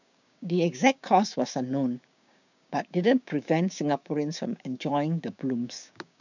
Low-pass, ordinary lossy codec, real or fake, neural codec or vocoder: 7.2 kHz; none; fake; codec, 16 kHz, 6 kbps, DAC